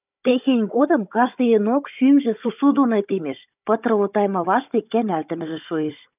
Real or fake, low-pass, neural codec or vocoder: fake; 3.6 kHz; codec, 16 kHz, 16 kbps, FunCodec, trained on Chinese and English, 50 frames a second